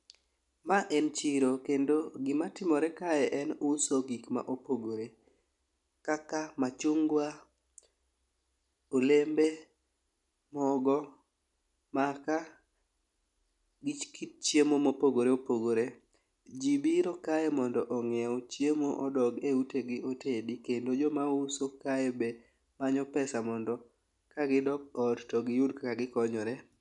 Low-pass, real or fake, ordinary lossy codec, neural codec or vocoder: 10.8 kHz; real; none; none